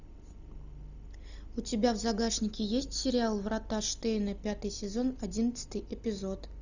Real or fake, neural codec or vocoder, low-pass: real; none; 7.2 kHz